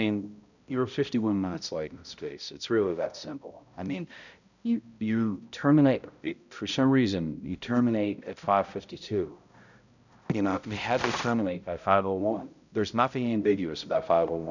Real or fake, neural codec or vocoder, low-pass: fake; codec, 16 kHz, 0.5 kbps, X-Codec, HuBERT features, trained on balanced general audio; 7.2 kHz